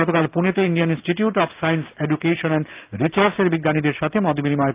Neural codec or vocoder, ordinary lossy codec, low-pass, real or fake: none; Opus, 24 kbps; 3.6 kHz; real